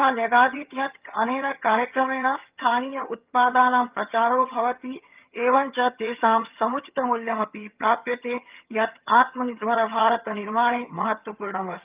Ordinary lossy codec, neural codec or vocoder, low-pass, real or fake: Opus, 24 kbps; vocoder, 22.05 kHz, 80 mel bands, HiFi-GAN; 3.6 kHz; fake